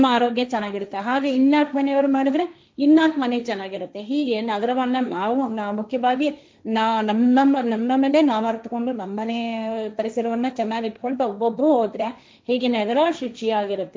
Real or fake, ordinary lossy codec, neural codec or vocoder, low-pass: fake; none; codec, 16 kHz, 1.1 kbps, Voila-Tokenizer; none